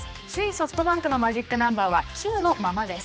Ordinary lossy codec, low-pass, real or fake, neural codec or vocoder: none; none; fake; codec, 16 kHz, 2 kbps, X-Codec, HuBERT features, trained on general audio